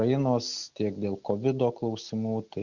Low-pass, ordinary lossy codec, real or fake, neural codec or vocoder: 7.2 kHz; Opus, 64 kbps; real; none